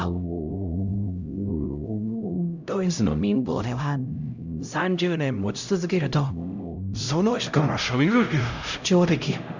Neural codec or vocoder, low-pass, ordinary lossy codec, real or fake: codec, 16 kHz, 0.5 kbps, X-Codec, HuBERT features, trained on LibriSpeech; 7.2 kHz; none; fake